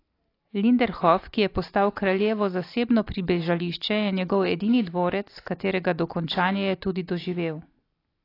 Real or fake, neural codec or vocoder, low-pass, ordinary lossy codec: real; none; 5.4 kHz; AAC, 32 kbps